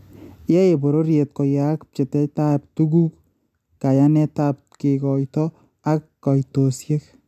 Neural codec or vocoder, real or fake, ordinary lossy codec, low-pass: none; real; AAC, 96 kbps; 14.4 kHz